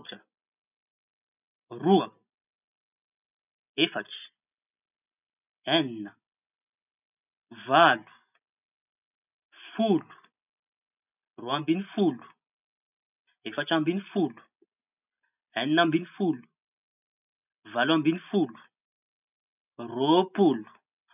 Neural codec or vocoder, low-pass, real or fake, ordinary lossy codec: none; 3.6 kHz; real; none